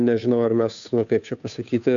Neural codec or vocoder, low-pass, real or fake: codec, 16 kHz, 2 kbps, FunCodec, trained on Chinese and English, 25 frames a second; 7.2 kHz; fake